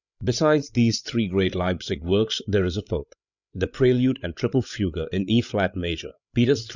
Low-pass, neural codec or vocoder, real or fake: 7.2 kHz; codec, 16 kHz, 8 kbps, FreqCodec, larger model; fake